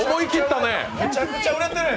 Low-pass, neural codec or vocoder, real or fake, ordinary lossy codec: none; none; real; none